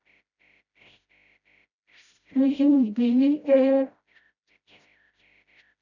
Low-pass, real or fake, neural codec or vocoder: 7.2 kHz; fake; codec, 16 kHz, 0.5 kbps, FreqCodec, smaller model